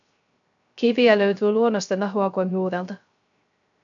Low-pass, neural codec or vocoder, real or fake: 7.2 kHz; codec, 16 kHz, 0.3 kbps, FocalCodec; fake